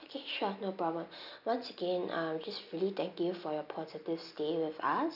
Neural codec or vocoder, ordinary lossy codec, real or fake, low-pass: none; none; real; 5.4 kHz